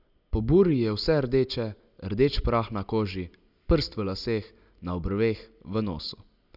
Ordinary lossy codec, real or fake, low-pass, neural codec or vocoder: none; real; 5.4 kHz; none